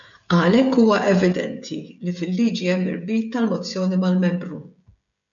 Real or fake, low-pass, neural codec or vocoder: fake; 7.2 kHz; codec, 16 kHz, 16 kbps, FreqCodec, smaller model